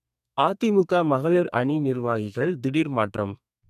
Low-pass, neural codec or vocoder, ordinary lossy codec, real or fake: 14.4 kHz; codec, 32 kHz, 1.9 kbps, SNAC; none; fake